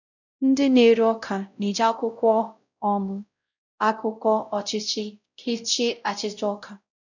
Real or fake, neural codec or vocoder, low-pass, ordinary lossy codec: fake; codec, 16 kHz, 0.5 kbps, X-Codec, WavLM features, trained on Multilingual LibriSpeech; 7.2 kHz; none